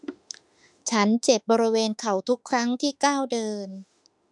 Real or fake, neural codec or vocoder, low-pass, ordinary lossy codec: fake; autoencoder, 48 kHz, 32 numbers a frame, DAC-VAE, trained on Japanese speech; 10.8 kHz; none